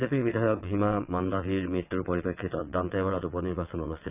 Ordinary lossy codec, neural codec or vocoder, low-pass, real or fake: none; vocoder, 22.05 kHz, 80 mel bands, WaveNeXt; 3.6 kHz; fake